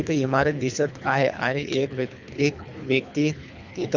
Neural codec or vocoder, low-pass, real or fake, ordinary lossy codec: codec, 24 kHz, 3 kbps, HILCodec; 7.2 kHz; fake; none